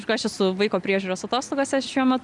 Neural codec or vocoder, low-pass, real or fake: none; 10.8 kHz; real